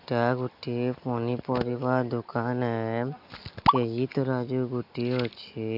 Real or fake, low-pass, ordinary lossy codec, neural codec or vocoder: real; 5.4 kHz; AAC, 48 kbps; none